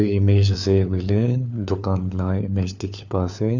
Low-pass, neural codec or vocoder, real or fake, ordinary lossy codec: 7.2 kHz; codec, 16 kHz, 4 kbps, FunCodec, trained on LibriTTS, 50 frames a second; fake; none